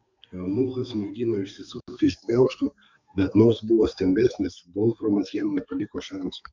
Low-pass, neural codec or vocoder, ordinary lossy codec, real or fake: 7.2 kHz; codec, 32 kHz, 1.9 kbps, SNAC; MP3, 64 kbps; fake